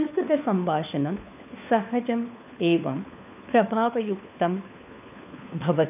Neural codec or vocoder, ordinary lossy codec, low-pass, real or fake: codec, 16 kHz, 4 kbps, FunCodec, trained on LibriTTS, 50 frames a second; none; 3.6 kHz; fake